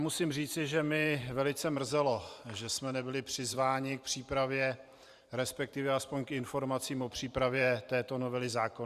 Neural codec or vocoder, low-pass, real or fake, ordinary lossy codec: vocoder, 44.1 kHz, 128 mel bands every 512 samples, BigVGAN v2; 14.4 kHz; fake; Opus, 64 kbps